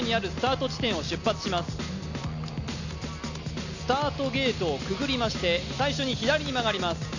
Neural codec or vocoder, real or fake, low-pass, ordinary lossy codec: none; real; 7.2 kHz; none